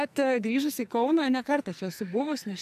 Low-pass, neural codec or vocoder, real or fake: 14.4 kHz; codec, 44.1 kHz, 2.6 kbps, SNAC; fake